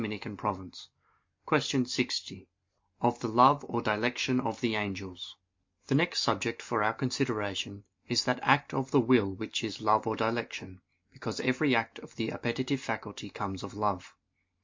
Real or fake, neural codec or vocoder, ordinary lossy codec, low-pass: real; none; MP3, 48 kbps; 7.2 kHz